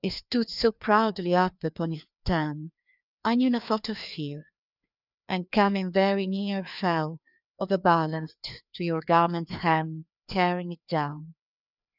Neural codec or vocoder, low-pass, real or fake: codec, 16 kHz, 2 kbps, FreqCodec, larger model; 5.4 kHz; fake